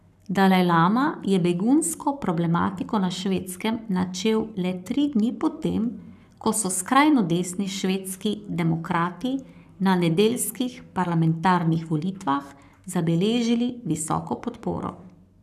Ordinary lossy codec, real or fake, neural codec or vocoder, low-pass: none; fake; codec, 44.1 kHz, 7.8 kbps, Pupu-Codec; 14.4 kHz